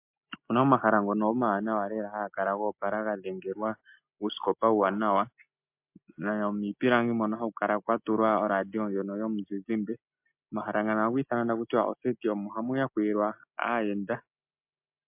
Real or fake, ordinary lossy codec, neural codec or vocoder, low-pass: real; MP3, 32 kbps; none; 3.6 kHz